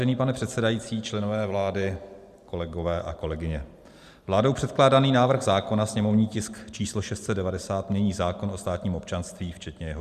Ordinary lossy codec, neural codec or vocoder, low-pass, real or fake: Opus, 64 kbps; vocoder, 44.1 kHz, 128 mel bands every 512 samples, BigVGAN v2; 14.4 kHz; fake